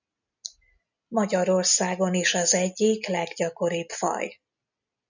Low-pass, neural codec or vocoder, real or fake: 7.2 kHz; none; real